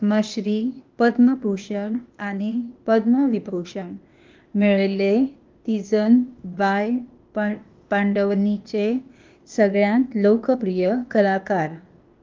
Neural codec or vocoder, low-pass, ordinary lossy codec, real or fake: codec, 16 kHz, 0.8 kbps, ZipCodec; 7.2 kHz; Opus, 24 kbps; fake